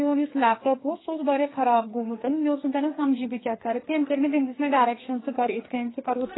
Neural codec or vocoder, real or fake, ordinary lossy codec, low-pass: codec, 44.1 kHz, 2.6 kbps, SNAC; fake; AAC, 16 kbps; 7.2 kHz